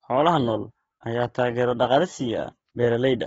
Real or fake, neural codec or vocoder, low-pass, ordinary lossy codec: fake; codec, 44.1 kHz, 7.8 kbps, DAC; 19.8 kHz; AAC, 24 kbps